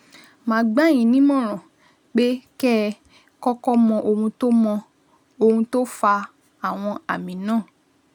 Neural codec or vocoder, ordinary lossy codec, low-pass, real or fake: none; none; 19.8 kHz; real